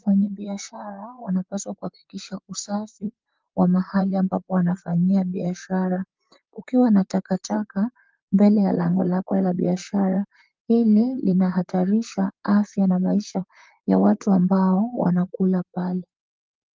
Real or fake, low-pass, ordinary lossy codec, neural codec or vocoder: fake; 7.2 kHz; Opus, 24 kbps; vocoder, 44.1 kHz, 128 mel bands, Pupu-Vocoder